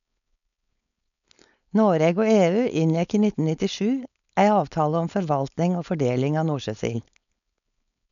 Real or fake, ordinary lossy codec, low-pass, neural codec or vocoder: fake; none; 7.2 kHz; codec, 16 kHz, 4.8 kbps, FACodec